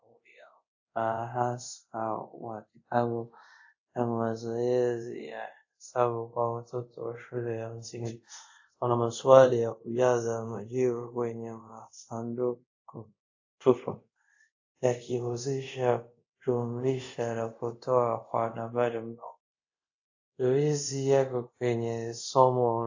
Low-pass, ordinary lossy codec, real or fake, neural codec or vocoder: 7.2 kHz; AAC, 48 kbps; fake; codec, 24 kHz, 0.5 kbps, DualCodec